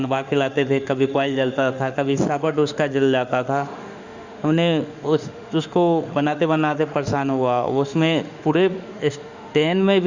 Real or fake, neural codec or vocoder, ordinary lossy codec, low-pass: fake; autoencoder, 48 kHz, 32 numbers a frame, DAC-VAE, trained on Japanese speech; Opus, 64 kbps; 7.2 kHz